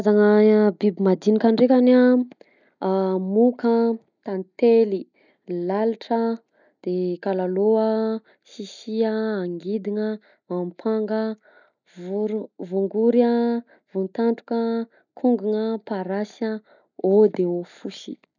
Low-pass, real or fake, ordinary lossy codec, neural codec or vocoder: 7.2 kHz; real; none; none